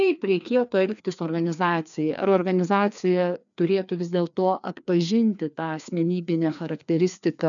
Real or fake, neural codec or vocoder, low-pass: fake; codec, 16 kHz, 2 kbps, FreqCodec, larger model; 7.2 kHz